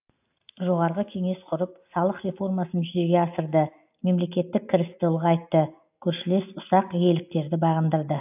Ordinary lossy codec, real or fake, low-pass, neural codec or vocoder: AAC, 32 kbps; real; 3.6 kHz; none